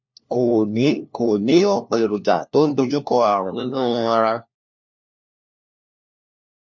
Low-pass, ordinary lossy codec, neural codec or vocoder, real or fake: 7.2 kHz; MP3, 48 kbps; codec, 16 kHz, 1 kbps, FunCodec, trained on LibriTTS, 50 frames a second; fake